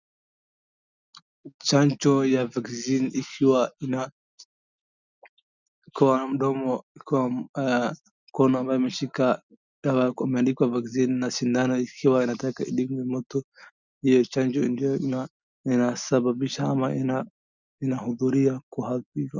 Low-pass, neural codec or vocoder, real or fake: 7.2 kHz; none; real